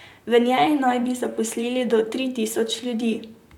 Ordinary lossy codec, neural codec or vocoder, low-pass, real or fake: none; vocoder, 44.1 kHz, 128 mel bands, Pupu-Vocoder; 19.8 kHz; fake